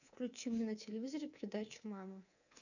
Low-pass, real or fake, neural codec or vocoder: 7.2 kHz; fake; codec, 24 kHz, 3.1 kbps, DualCodec